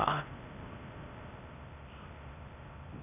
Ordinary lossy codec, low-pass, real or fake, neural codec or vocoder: none; 3.6 kHz; fake; codec, 16 kHz in and 24 kHz out, 0.6 kbps, FocalCodec, streaming, 4096 codes